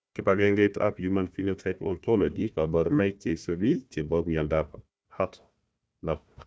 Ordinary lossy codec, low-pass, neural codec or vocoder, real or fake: none; none; codec, 16 kHz, 1 kbps, FunCodec, trained on Chinese and English, 50 frames a second; fake